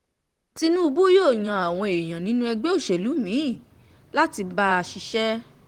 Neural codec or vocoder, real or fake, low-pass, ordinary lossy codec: vocoder, 44.1 kHz, 128 mel bands, Pupu-Vocoder; fake; 19.8 kHz; Opus, 24 kbps